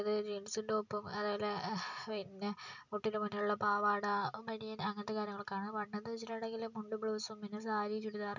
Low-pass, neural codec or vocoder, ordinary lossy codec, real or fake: 7.2 kHz; none; none; real